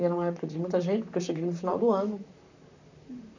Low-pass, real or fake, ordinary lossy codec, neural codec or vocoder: 7.2 kHz; fake; none; vocoder, 44.1 kHz, 128 mel bands, Pupu-Vocoder